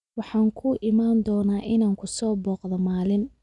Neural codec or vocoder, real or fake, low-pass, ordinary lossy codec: none; real; 10.8 kHz; none